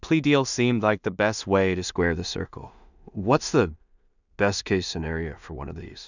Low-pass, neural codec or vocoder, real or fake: 7.2 kHz; codec, 16 kHz in and 24 kHz out, 0.4 kbps, LongCat-Audio-Codec, two codebook decoder; fake